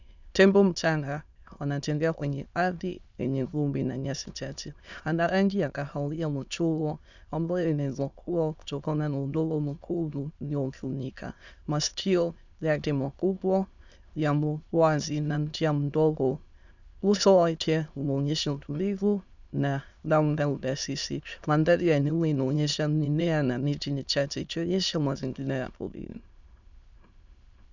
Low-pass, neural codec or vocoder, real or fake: 7.2 kHz; autoencoder, 22.05 kHz, a latent of 192 numbers a frame, VITS, trained on many speakers; fake